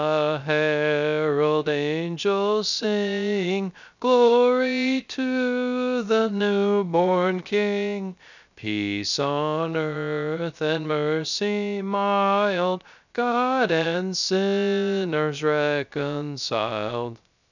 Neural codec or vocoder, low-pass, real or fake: codec, 16 kHz, 0.3 kbps, FocalCodec; 7.2 kHz; fake